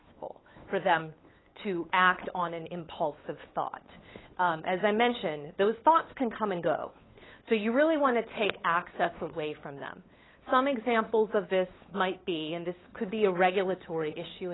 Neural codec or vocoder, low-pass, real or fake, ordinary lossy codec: codec, 16 kHz, 8 kbps, FunCodec, trained on LibriTTS, 25 frames a second; 7.2 kHz; fake; AAC, 16 kbps